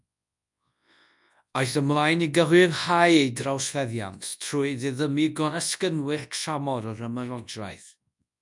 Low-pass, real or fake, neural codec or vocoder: 10.8 kHz; fake; codec, 24 kHz, 0.9 kbps, WavTokenizer, large speech release